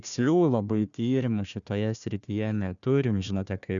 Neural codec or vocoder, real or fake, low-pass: codec, 16 kHz, 1 kbps, FunCodec, trained on Chinese and English, 50 frames a second; fake; 7.2 kHz